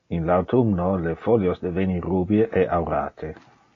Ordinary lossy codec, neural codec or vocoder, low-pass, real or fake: AAC, 32 kbps; none; 7.2 kHz; real